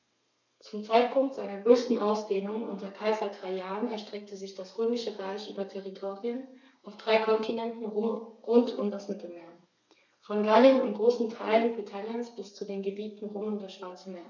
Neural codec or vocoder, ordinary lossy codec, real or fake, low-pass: codec, 32 kHz, 1.9 kbps, SNAC; none; fake; 7.2 kHz